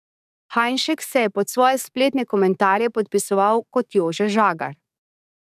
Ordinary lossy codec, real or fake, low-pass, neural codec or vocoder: none; fake; 14.4 kHz; codec, 44.1 kHz, 7.8 kbps, DAC